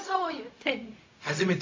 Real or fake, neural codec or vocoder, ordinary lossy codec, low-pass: fake; codec, 16 kHz, 0.4 kbps, LongCat-Audio-Codec; MP3, 48 kbps; 7.2 kHz